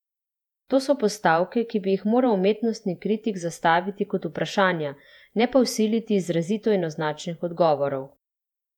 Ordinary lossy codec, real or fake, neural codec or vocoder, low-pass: none; fake; vocoder, 48 kHz, 128 mel bands, Vocos; 19.8 kHz